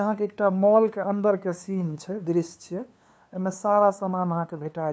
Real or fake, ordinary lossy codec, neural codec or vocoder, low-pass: fake; none; codec, 16 kHz, 2 kbps, FunCodec, trained on LibriTTS, 25 frames a second; none